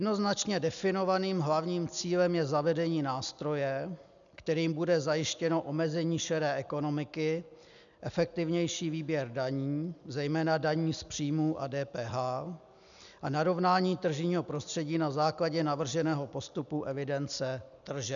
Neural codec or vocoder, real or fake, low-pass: none; real; 7.2 kHz